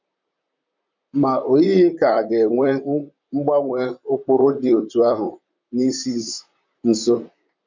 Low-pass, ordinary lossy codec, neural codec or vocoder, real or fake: 7.2 kHz; MP3, 64 kbps; vocoder, 44.1 kHz, 128 mel bands, Pupu-Vocoder; fake